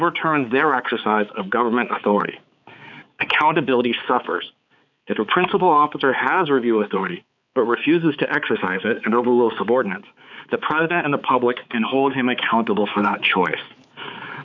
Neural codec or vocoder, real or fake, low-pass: codec, 16 kHz, 4 kbps, X-Codec, HuBERT features, trained on balanced general audio; fake; 7.2 kHz